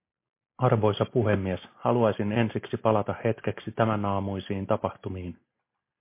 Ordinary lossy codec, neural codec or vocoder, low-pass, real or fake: MP3, 24 kbps; none; 3.6 kHz; real